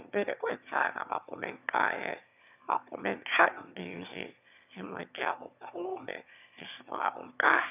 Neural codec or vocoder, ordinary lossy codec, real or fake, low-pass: autoencoder, 22.05 kHz, a latent of 192 numbers a frame, VITS, trained on one speaker; none; fake; 3.6 kHz